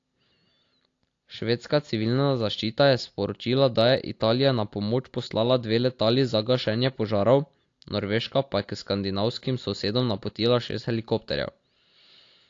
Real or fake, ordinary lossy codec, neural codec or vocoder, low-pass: real; AAC, 48 kbps; none; 7.2 kHz